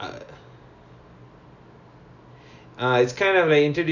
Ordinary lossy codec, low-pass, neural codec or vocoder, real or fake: none; 7.2 kHz; none; real